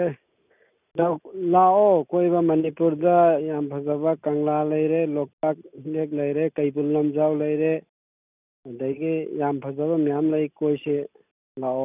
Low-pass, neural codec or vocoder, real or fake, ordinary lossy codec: 3.6 kHz; none; real; none